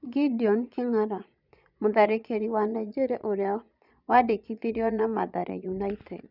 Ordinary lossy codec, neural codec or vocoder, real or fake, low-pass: none; vocoder, 22.05 kHz, 80 mel bands, Vocos; fake; 5.4 kHz